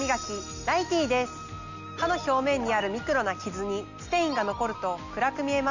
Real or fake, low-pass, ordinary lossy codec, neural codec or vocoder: real; 7.2 kHz; none; none